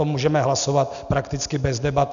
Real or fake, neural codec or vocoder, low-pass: real; none; 7.2 kHz